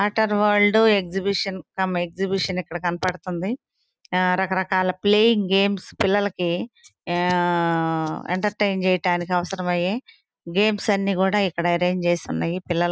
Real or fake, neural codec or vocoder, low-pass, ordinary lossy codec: real; none; none; none